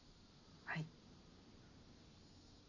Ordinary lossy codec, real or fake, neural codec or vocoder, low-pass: none; real; none; 7.2 kHz